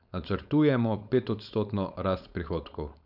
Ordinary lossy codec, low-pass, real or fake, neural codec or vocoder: none; 5.4 kHz; fake; codec, 16 kHz, 4.8 kbps, FACodec